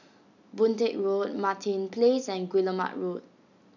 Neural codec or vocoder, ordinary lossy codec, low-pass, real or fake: none; none; 7.2 kHz; real